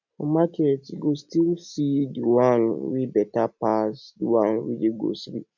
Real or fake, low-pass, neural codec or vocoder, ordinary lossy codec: real; 7.2 kHz; none; none